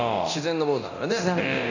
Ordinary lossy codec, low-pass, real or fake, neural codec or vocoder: none; 7.2 kHz; fake; codec, 24 kHz, 0.9 kbps, DualCodec